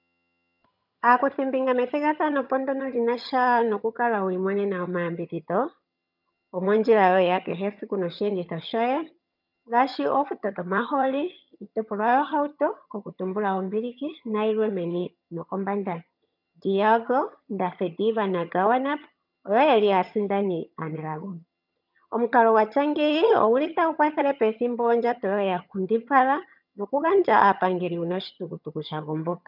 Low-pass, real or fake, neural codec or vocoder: 5.4 kHz; fake; vocoder, 22.05 kHz, 80 mel bands, HiFi-GAN